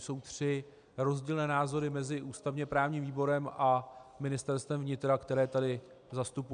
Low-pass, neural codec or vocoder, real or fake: 9.9 kHz; none; real